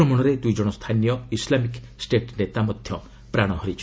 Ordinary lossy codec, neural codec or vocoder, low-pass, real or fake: none; none; none; real